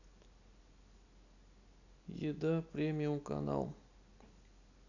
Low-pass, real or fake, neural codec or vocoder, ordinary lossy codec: 7.2 kHz; real; none; none